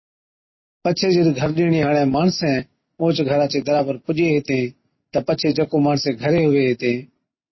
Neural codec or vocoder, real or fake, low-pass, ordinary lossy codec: none; real; 7.2 kHz; MP3, 24 kbps